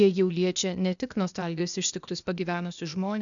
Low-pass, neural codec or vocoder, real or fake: 7.2 kHz; codec, 16 kHz, 0.8 kbps, ZipCodec; fake